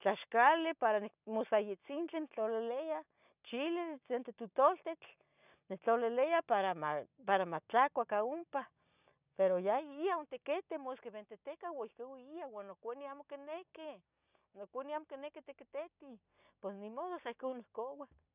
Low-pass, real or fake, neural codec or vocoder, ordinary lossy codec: 3.6 kHz; real; none; none